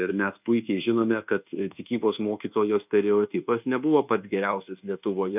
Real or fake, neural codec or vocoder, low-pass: fake; codec, 24 kHz, 1.2 kbps, DualCodec; 3.6 kHz